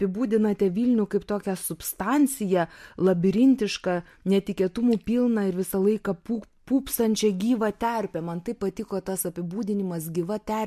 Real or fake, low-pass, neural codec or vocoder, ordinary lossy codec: real; 14.4 kHz; none; MP3, 64 kbps